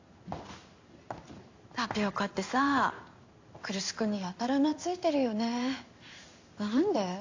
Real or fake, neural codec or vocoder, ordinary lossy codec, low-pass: fake; codec, 16 kHz in and 24 kHz out, 1 kbps, XY-Tokenizer; AAC, 48 kbps; 7.2 kHz